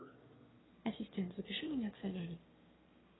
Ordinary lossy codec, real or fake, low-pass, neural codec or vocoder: AAC, 16 kbps; fake; 7.2 kHz; autoencoder, 22.05 kHz, a latent of 192 numbers a frame, VITS, trained on one speaker